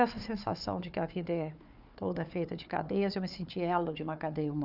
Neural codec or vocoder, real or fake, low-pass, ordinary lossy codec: codec, 16 kHz, 4 kbps, FunCodec, trained on Chinese and English, 50 frames a second; fake; 5.4 kHz; none